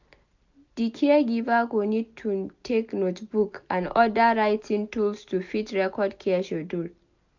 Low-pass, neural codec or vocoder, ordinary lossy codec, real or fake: 7.2 kHz; none; none; real